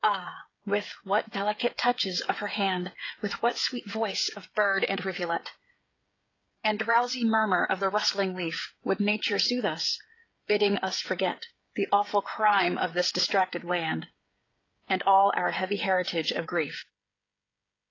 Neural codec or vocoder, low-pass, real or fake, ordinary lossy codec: codec, 16 kHz, 16 kbps, FreqCodec, smaller model; 7.2 kHz; fake; AAC, 32 kbps